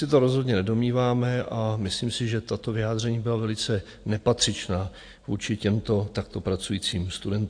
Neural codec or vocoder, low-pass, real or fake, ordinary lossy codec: none; 9.9 kHz; real; AAC, 48 kbps